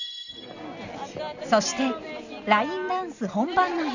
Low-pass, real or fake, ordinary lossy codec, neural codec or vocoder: 7.2 kHz; real; none; none